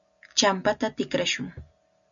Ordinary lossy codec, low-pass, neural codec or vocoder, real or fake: MP3, 96 kbps; 7.2 kHz; none; real